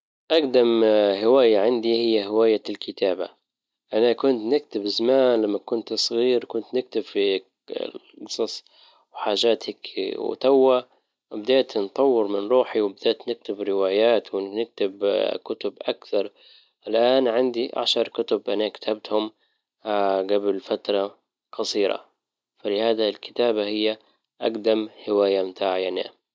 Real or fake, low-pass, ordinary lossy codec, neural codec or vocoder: real; none; none; none